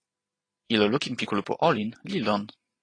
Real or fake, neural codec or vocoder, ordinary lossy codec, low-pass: real; none; AAC, 32 kbps; 9.9 kHz